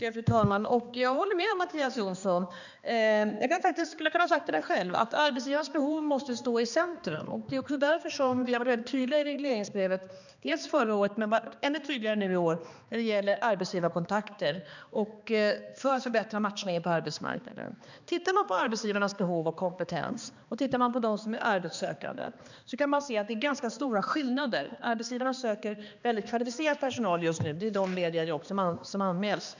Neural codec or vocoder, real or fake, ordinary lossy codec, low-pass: codec, 16 kHz, 2 kbps, X-Codec, HuBERT features, trained on balanced general audio; fake; none; 7.2 kHz